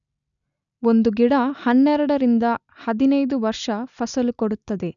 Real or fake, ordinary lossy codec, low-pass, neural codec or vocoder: real; none; 7.2 kHz; none